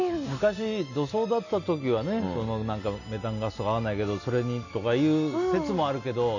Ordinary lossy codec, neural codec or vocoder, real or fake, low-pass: none; none; real; 7.2 kHz